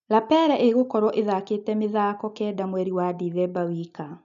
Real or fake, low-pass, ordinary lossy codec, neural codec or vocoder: real; 7.2 kHz; none; none